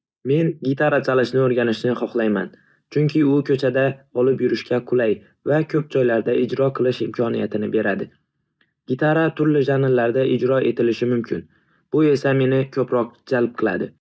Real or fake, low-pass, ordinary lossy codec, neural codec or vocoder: real; none; none; none